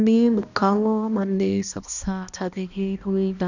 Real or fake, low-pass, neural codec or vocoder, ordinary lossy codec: fake; 7.2 kHz; codec, 16 kHz, 1 kbps, X-Codec, HuBERT features, trained on balanced general audio; none